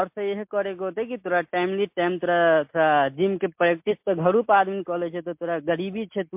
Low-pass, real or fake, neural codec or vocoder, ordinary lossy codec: 3.6 kHz; real; none; MP3, 32 kbps